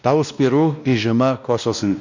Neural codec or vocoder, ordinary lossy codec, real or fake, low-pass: codec, 16 kHz, 1 kbps, X-Codec, WavLM features, trained on Multilingual LibriSpeech; none; fake; 7.2 kHz